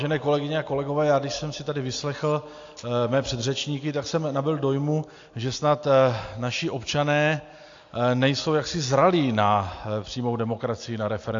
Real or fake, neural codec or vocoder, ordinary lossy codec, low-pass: real; none; AAC, 48 kbps; 7.2 kHz